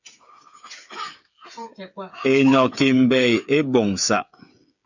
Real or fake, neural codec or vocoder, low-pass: fake; codec, 16 kHz, 8 kbps, FreqCodec, smaller model; 7.2 kHz